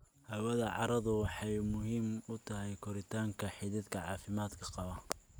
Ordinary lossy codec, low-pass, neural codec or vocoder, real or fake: none; none; none; real